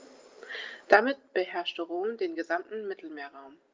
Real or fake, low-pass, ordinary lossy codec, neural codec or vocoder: real; 7.2 kHz; Opus, 24 kbps; none